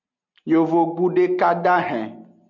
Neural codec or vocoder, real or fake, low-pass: none; real; 7.2 kHz